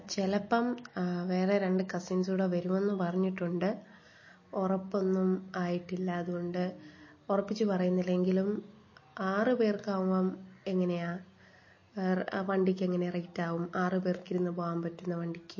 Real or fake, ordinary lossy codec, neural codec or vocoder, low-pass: real; MP3, 32 kbps; none; 7.2 kHz